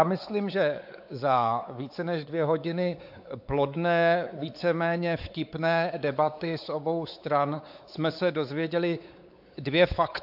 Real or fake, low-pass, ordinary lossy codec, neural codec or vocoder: fake; 5.4 kHz; AAC, 48 kbps; codec, 16 kHz, 4 kbps, X-Codec, WavLM features, trained on Multilingual LibriSpeech